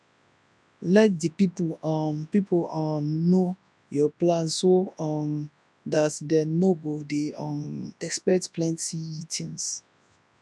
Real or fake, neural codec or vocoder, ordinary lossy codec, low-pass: fake; codec, 24 kHz, 0.9 kbps, WavTokenizer, large speech release; none; none